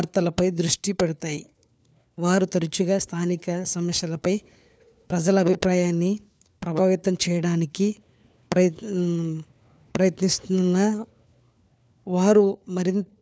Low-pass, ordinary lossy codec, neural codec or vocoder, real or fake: none; none; codec, 16 kHz, 4 kbps, FunCodec, trained on LibriTTS, 50 frames a second; fake